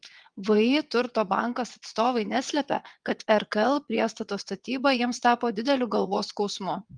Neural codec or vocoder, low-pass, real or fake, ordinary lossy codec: vocoder, 22.05 kHz, 80 mel bands, WaveNeXt; 9.9 kHz; fake; Opus, 32 kbps